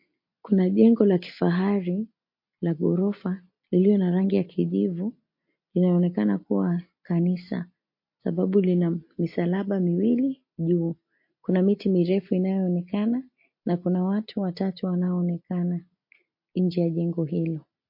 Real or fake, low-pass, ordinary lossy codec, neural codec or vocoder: real; 5.4 kHz; MP3, 32 kbps; none